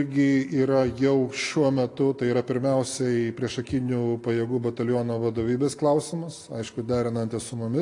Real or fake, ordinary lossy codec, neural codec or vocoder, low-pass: real; AAC, 48 kbps; none; 10.8 kHz